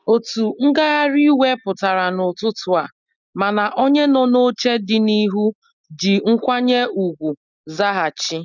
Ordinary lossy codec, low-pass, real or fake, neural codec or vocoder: none; 7.2 kHz; real; none